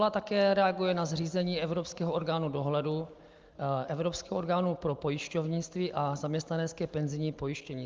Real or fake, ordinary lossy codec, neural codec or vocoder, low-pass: real; Opus, 24 kbps; none; 7.2 kHz